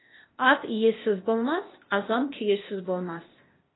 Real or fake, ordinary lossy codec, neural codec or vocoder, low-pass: fake; AAC, 16 kbps; codec, 16 kHz, 0.8 kbps, ZipCodec; 7.2 kHz